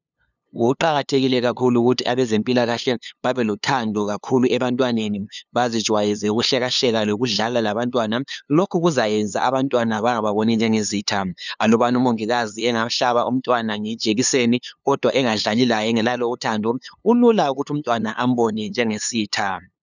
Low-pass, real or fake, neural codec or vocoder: 7.2 kHz; fake; codec, 16 kHz, 2 kbps, FunCodec, trained on LibriTTS, 25 frames a second